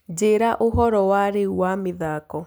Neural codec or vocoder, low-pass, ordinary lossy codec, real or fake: none; none; none; real